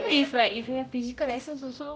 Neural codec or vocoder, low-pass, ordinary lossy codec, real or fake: codec, 16 kHz, 0.5 kbps, X-Codec, HuBERT features, trained on general audio; none; none; fake